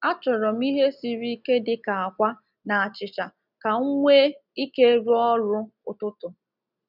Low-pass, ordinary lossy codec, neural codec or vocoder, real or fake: 5.4 kHz; none; none; real